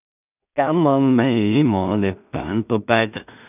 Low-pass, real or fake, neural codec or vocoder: 3.6 kHz; fake; codec, 16 kHz in and 24 kHz out, 0.4 kbps, LongCat-Audio-Codec, two codebook decoder